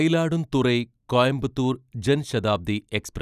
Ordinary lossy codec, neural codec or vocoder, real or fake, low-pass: none; none; real; 14.4 kHz